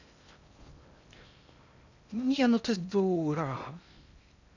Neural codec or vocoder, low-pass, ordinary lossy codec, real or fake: codec, 16 kHz in and 24 kHz out, 0.6 kbps, FocalCodec, streaming, 2048 codes; 7.2 kHz; none; fake